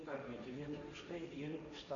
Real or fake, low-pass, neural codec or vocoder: fake; 7.2 kHz; codec, 16 kHz, 1.1 kbps, Voila-Tokenizer